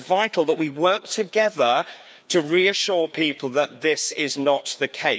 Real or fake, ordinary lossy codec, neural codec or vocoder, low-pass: fake; none; codec, 16 kHz, 2 kbps, FreqCodec, larger model; none